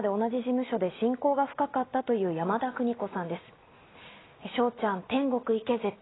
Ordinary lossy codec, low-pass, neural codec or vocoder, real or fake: AAC, 16 kbps; 7.2 kHz; none; real